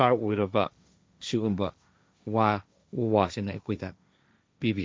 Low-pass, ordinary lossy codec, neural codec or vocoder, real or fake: none; none; codec, 16 kHz, 1.1 kbps, Voila-Tokenizer; fake